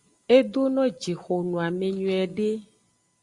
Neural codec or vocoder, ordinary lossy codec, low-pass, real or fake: none; Opus, 64 kbps; 10.8 kHz; real